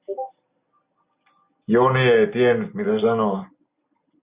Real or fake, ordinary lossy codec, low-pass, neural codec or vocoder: real; Opus, 64 kbps; 3.6 kHz; none